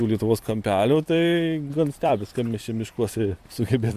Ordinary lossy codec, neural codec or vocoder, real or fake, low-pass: AAC, 96 kbps; vocoder, 44.1 kHz, 128 mel bands every 256 samples, BigVGAN v2; fake; 14.4 kHz